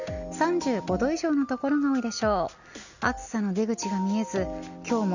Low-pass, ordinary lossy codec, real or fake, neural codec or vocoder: 7.2 kHz; none; real; none